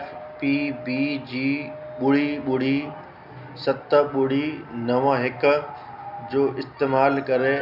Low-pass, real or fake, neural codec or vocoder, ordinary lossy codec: 5.4 kHz; real; none; none